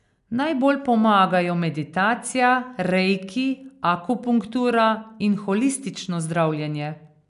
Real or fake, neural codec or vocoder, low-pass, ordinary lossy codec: real; none; 10.8 kHz; none